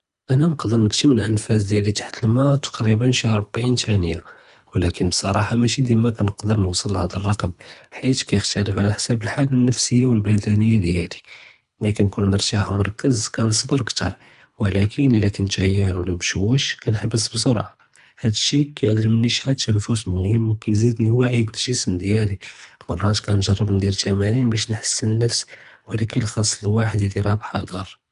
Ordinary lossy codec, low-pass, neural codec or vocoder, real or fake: none; 10.8 kHz; codec, 24 kHz, 3 kbps, HILCodec; fake